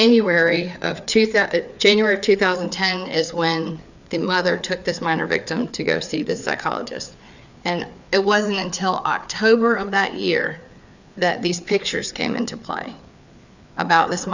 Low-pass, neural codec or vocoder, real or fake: 7.2 kHz; codec, 16 kHz, 4 kbps, FreqCodec, larger model; fake